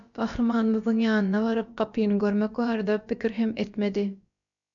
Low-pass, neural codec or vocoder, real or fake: 7.2 kHz; codec, 16 kHz, about 1 kbps, DyCAST, with the encoder's durations; fake